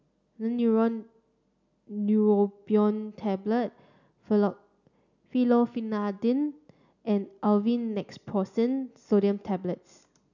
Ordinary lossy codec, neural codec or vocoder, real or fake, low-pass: none; none; real; 7.2 kHz